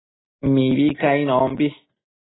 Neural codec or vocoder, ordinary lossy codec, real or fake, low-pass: none; AAC, 16 kbps; real; 7.2 kHz